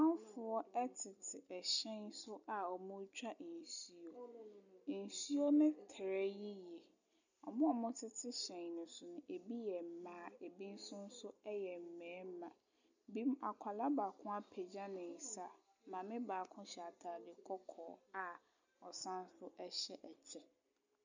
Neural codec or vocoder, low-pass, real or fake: none; 7.2 kHz; real